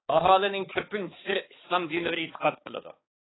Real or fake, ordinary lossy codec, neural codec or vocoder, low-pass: fake; AAC, 16 kbps; codec, 16 kHz, 4 kbps, X-Codec, HuBERT features, trained on balanced general audio; 7.2 kHz